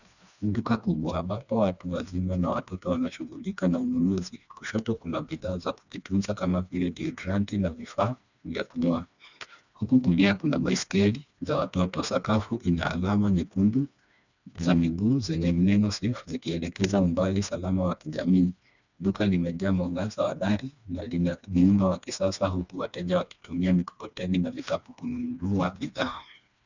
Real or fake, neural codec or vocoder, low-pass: fake; codec, 16 kHz, 2 kbps, FreqCodec, smaller model; 7.2 kHz